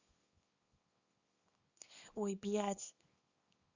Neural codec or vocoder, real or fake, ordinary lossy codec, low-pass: codec, 24 kHz, 0.9 kbps, WavTokenizer, small release; fake; Opus, 64 kbps; 7.2 kHz